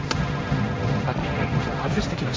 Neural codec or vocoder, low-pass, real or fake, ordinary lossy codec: codec, 16 kHz, 1.1 kbps, Voila-Tokenizer; none; fake; none